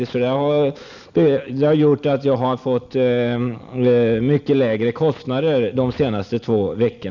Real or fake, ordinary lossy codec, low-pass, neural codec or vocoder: real; none; 7.2 kHz; none